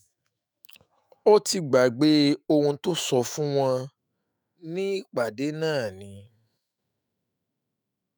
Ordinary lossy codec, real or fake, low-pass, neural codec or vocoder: none; fake; none; autoencoder, 48 kHz, 128 numbers a frame, DAC-VAE, trained on Japanese speech